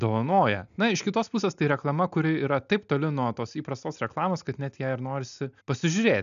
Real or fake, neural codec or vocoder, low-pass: real; none; 7.2 kHz